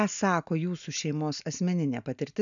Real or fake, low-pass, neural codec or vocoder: real; 7.2 kHz; none